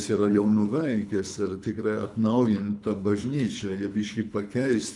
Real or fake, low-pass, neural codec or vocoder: fake; 10.8 kHz; codec, 24 kHz, 3 kbps, HILCodec